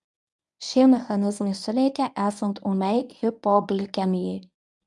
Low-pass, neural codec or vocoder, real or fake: 10.8 kHz; codec, 24 kHz, 0.9 kbps, WavTokenizer, medium speech release version 1; fake